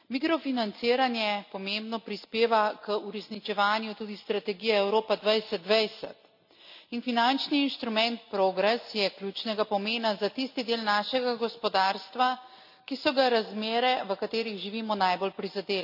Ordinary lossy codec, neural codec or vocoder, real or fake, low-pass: none; none; real; 5.4 kHz